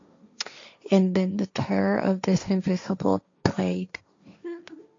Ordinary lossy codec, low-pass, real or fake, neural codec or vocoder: AAC, 48 kbps; 7.2 kHz; fake; codec, 16 kHz, 1.1 kbps, Voila-Tokenizer